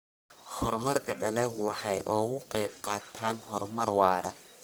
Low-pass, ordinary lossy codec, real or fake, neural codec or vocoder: none; none; fake; codec, 44.1 kHz, 1.7 kbps, Pupu-Codec